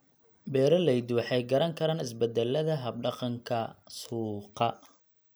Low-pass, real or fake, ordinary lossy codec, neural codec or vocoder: none; real; none; none